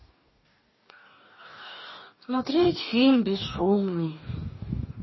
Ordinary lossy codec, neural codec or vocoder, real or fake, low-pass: MP3, 24 kbps; codec, 44.1 kHz, 2.6 kbps, DAC; fake; 7.2 kHz